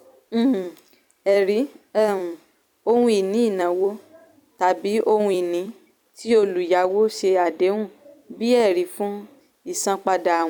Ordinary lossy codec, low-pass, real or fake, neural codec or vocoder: none; 19.8 kHz; fake; vocoder, 44.1 kHz, 128 mel bands every 256 samples, BigVGAN v2